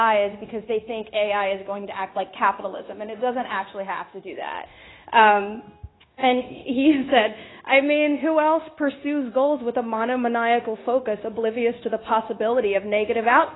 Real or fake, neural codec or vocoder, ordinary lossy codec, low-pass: fake; codec, 16 kHz, 0.9 kbps, LongCat-Audio-Codec; AAC, 16 kbps; 7.2 kHz